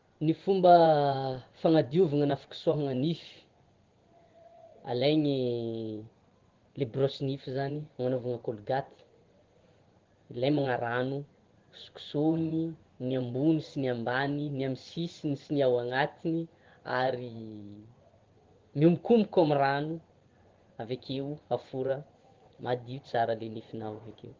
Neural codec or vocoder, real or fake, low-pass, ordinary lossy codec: vocoder, 24 kHz, 100 mel bands, Vocos; fake; 7.2 kHz; Opus, 16 kbps